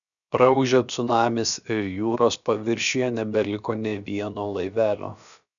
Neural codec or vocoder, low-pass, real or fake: codec, 16 kHz, about 1 kbps, DyCAST, with the encoder's durations; 7.2 kHz; fake